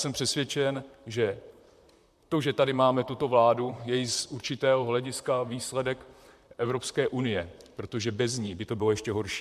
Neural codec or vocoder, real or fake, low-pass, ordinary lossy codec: vocoder, 44.1 kHz, 128 mel bands, Pupu-Vocoder; fake; 14.4 kHz; MP3, 96 kbps